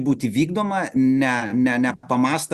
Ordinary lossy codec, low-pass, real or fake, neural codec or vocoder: Opus, 64 kbps; 14.4 kHz; real; none